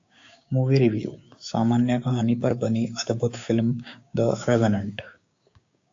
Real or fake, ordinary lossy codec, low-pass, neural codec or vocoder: fake; AAC, 48 kbps; 7.2 kHz; codec, 16 kHz, 6 kbps, DAC